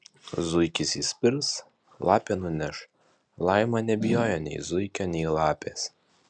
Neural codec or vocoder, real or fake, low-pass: none; real; 9.9 kHz